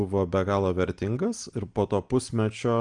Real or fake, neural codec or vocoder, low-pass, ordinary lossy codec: real; none; 9.9 kHz; Opus, 24 kbps